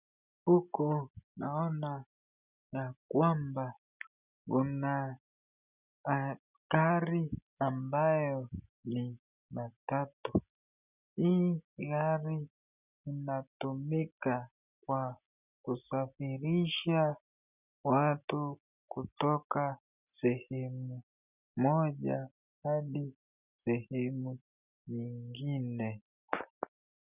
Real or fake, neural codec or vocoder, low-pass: real; none; 3.6 kHz